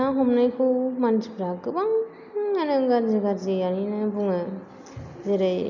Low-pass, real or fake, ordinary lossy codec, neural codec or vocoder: 7.2 kHz; real; none; none